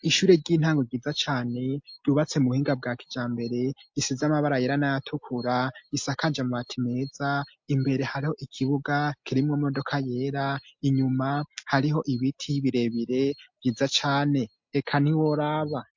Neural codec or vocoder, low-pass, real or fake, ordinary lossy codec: none; 7.2 kHz; real; MP3, 48 kbps